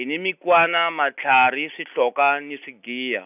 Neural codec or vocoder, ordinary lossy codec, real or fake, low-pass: none; none; real; 3.6 kHz